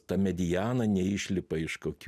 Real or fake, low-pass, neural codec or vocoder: real; 14.4 kHz; none